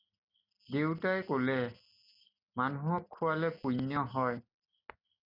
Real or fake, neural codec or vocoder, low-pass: real; none; 5.4 kHz